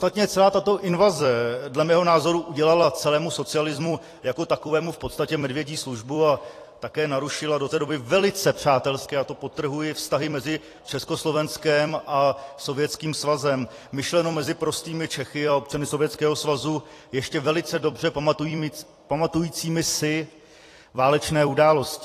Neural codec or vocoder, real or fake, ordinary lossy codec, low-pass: vocoder, 44.1 kHz, 128 mel bands every 256 samples, BigVGAN v2; fake; AAC, 48 kbps; 14.4 kHz